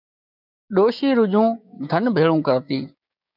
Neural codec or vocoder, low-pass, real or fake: codec, 16 kHz, 6 kbps, DAC; 5.4 kHz; fake